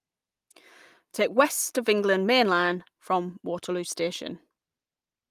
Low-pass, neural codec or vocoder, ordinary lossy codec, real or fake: 14.4 kHz; none; Opus, 32 kbps; real